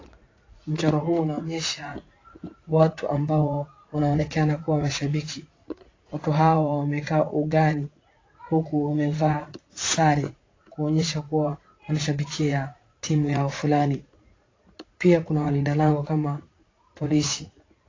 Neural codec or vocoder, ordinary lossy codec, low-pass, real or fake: vocoder, 44.1 kHz, 80 mel bands, Vocos; AAC, 32 kbps; 7.2 kHz; fake